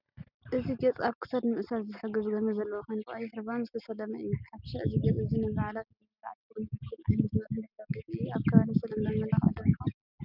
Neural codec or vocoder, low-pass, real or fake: none; 5.4 kHz; real